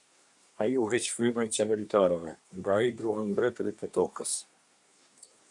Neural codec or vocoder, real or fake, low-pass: codec, 24 kHz, 1 kbps, SNAC; fake; 10.8 kHz